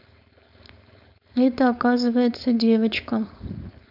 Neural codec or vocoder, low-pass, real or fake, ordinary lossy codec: codec, 16 kHz, 4.8 kbps, FACodec; 5.4 kHz; fake; none